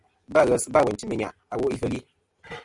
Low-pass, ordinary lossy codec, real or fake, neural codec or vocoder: 10.8 kHz; Opus, 64 kbps; real; none